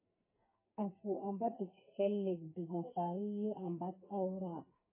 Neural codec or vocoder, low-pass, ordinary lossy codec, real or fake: codec, 44.1 kHz, 2.6 kbps, SNAC; 3.6 kHz; MP3, 16 kbps; fake